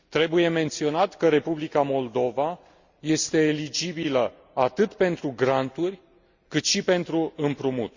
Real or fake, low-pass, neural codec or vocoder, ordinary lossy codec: real; 7.2 kHz; none; Opus, 64 kbps